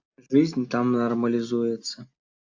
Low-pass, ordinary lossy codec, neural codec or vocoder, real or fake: 7.2 kHz; AAC, 48 kbps; none; real